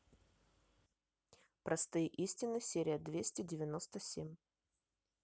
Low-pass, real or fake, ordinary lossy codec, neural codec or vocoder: none; real; none; none